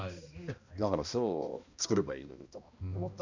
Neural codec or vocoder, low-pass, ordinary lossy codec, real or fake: codec, 16 kHz, 1 kbps, X-Codec, HuBERT features, trained on balanced general audio; 7.2 kHz; Opus, 64 kbps; fake